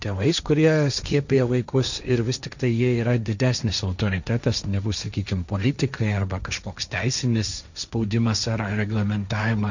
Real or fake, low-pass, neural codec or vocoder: fake; 7.2 kHz; codec, 16 kHz, 1.1 kbps, Voila-Tokenizer